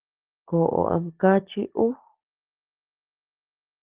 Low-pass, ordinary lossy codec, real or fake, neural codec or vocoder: 3.6 kHz; Opus, 16 kbps; real; none